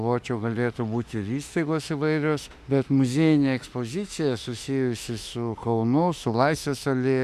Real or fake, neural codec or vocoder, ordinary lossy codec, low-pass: fake; autoencoder, 48 kHz, 32 numbers a frame, DAC-VAE, trained on Japanese speech; Opus, 64 kbps; 14.4 kHz